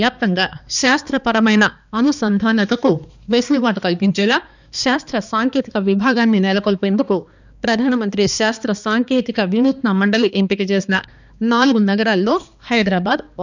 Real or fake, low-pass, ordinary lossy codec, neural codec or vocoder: fake; 7.2 kHz; none; codec, 16 kHz, 2 kbps, X-Codec, HuBERT features, trained on balanced general audio